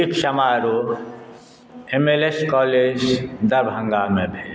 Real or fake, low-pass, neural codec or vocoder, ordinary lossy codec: real; none; none; none